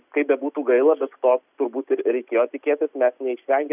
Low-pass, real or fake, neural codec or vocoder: 3.6 kHz; real; none